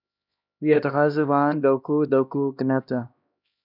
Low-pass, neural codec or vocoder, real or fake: 5.4 kHz; codec, 16 kHz, 1 kbps, X-Codec, HuBERT features, trained on LibriSpeech; fake